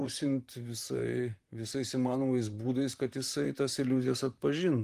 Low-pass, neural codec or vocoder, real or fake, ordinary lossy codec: 14.4 kHz; vocoder, 44.1 kHz, 128 mel bands, Pupu-Vocoder; fake; Opus, 24 kbps